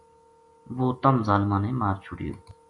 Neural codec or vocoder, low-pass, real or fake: none; 10.8 kHz; real